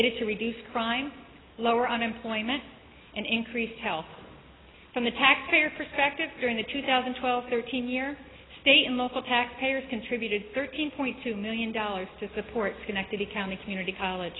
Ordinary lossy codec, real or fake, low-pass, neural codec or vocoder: AAC, 16 kbps; real; 7.2 kHz; none